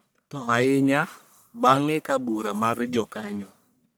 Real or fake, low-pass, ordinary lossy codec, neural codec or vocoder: fake; none; none; codec, 44.1 kHz, 1.7 kbps, Pupu-Codec